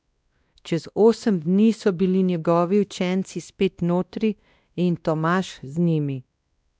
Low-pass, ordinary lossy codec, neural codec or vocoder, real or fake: none; none; codec, 16 kHz, 1 kbps, X-Codec, WavLM features, trained on Multilingual LibriSpeech; fake